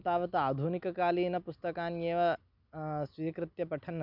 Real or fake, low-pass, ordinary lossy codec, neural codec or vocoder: real; 5.4 kHz; none; none